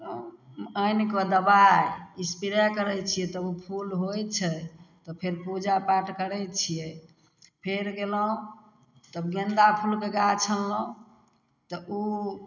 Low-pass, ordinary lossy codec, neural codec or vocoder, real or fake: 7.2 kHz; none; none; real